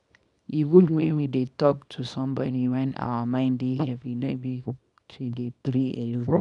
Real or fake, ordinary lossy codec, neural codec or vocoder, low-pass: fake; none; codec, 24 kHz, 0.9 kbps, WavTokenizer, small release; 10.8 kHz